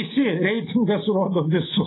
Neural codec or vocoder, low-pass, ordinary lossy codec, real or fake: none; 7.2 kHz; AAC, 16 kbps; real